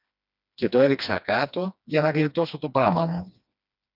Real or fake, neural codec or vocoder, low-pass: fake; codec, 16 kHz, 2 kbps, FreqCodec, smaller model; 5.4 kHz